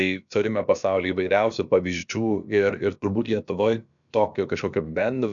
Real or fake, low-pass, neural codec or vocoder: fake; 7.2 kHz; codec, 16 kHz, about 1 kbps, DyCAST, with the encoder's durations